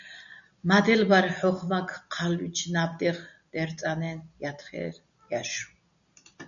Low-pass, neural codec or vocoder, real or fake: 7.2 kHz; none; real